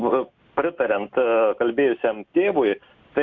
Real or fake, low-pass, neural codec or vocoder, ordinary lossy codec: real; 7.2 kHz; none; AAC, 48 kbps